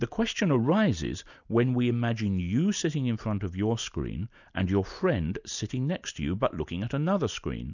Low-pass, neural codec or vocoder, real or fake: 7.2 kHz; none; real